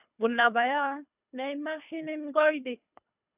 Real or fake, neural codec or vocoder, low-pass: fake; codec, 24 kHz, 3 kbps, HILCodec; 3.6 kHz